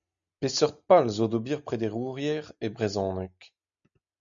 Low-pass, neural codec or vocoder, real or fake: 7.2 kHz; none; real